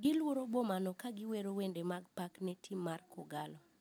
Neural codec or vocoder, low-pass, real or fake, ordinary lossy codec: none; none; real; none